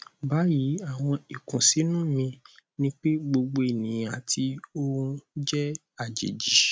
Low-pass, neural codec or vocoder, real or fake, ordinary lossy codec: none; none; real; none